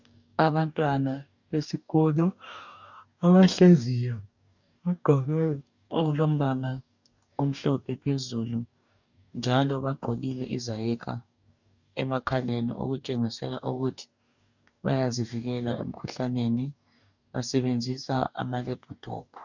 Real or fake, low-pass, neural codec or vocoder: fake; 7.2 kHz; codec, 44.1 kHz, 2.6 kbps, DAC